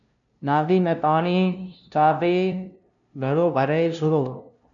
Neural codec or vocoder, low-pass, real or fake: codec, 16 kHz, 0.5 kbps, FunCodec, trained on LibriTTS, 25 frames a second; 7.2 kHz; fake